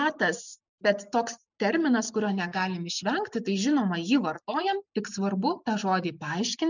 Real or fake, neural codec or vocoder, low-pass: real; none; 7.2 kHz